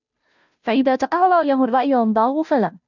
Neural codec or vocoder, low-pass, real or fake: codec, 16 kHz, 0.5 kbps, FunCodec, trained on Chinese and English, 25 frames a second; 7.2 kHz; fake